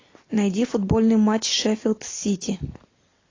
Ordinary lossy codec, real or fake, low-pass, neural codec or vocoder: AAC, 32 kbps; real; 7.2 kHz; none